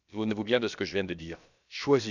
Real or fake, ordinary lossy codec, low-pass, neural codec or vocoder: fake; none; 7.2 kHz; codec, 16 kHz, about 1 kbps, DyCAST, with the encoder's durations